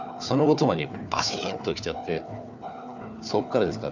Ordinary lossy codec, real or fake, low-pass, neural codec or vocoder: none; fake; 7.2 kHz; codec, 16 kHz, 4 kbps, FunCodec, trained on Chinese and English, 50 frames a second